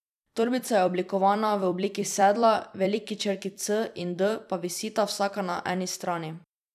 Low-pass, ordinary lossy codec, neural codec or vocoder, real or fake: 14.4 kHz; none; vocoder, 44.1 kHz, 128 mel bands every 256 samples, BigVGAN v2; fake